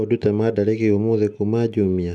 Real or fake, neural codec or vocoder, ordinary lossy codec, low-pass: real; none; none; none